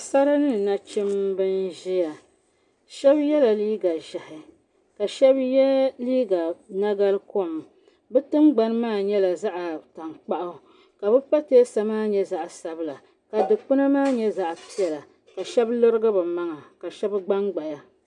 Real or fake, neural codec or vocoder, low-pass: real; none; 10.8 kHz